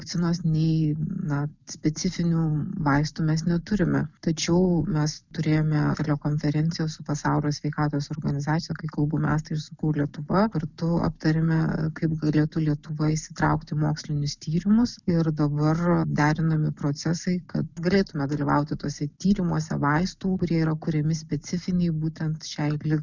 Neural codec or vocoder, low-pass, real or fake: none; 7.2 kHz; real